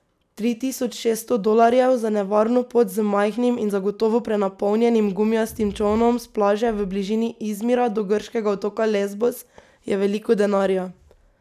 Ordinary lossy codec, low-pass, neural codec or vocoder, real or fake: none; 14.4 kHz; none; real